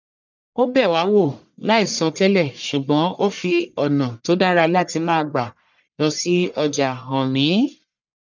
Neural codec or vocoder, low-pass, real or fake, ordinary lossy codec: codec, 44.1 kHz, 1.7 kbps, Pupu-Codec; 7.2 kHz; fake; none